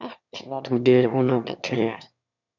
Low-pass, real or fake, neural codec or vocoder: 7.2 kHz; fake; autoencoder, 22.05 kHz, a latent of 192 numbers a frame, VITS, trained on one speaker